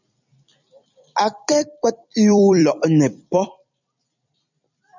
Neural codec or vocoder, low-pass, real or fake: vocoder, 44.1 kHz, 128 mel bands every 256 samples, BigVGAN v2; 7.2 kHz; fake